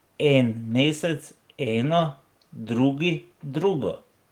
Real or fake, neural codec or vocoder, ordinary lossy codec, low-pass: fake; codec, 44.1 kHz, 7.8 kbps, DAC; Opus, 24 kbps; 19.8 kHz